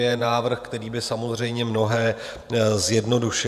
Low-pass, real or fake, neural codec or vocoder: 14.4 kHz; fake; vocoder, 48 kHz, 128 mel bands, Vocos